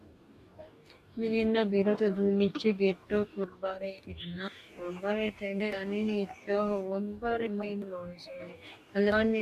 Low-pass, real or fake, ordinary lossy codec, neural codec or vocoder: 14.4 kHz; fake; AAC, 96 kbps; codec, 44.1 kHz, 2.6 kbps, DAC